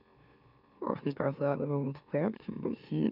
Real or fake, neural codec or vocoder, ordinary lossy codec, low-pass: fake; autoencoder, 44.1 kHz, a latent of 192 numbers a frame, MeloTTS; none; 5.4 kHz